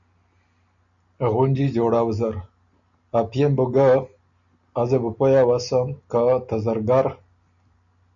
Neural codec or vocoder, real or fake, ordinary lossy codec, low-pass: none; real; MP3, 96 kbps; 7.2 kHz